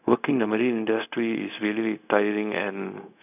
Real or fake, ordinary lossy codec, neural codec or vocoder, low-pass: fake; none; codec, 16 kHz, 0.4 kbps, LongCat-Audio-Codec; 3.6 kHz